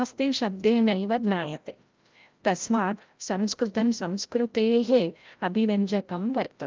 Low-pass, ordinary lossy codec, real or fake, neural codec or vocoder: 7.2 kHz; Opus, 24 kbps; fake; codec, 16 kHz, 0.5 kbps, FreqCodec, larger model